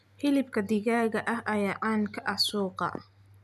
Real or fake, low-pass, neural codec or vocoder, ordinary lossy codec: real; 14.4 kHz; none; none